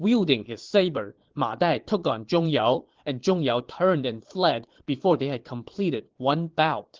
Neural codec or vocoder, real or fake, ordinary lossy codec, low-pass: vocoder, 22.05 kHz, 80 mel bands, Vocos; fake; Opus, 16 kbps; 7.2 kHz